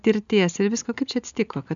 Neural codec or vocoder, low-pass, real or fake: none; 7.2 kHz; real